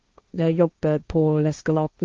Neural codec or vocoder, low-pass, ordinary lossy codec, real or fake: codec, 16 kHz, 1.1 kbps, Voila-Tokenizer; 7.2 kHz; Opus, 32 kbps; fake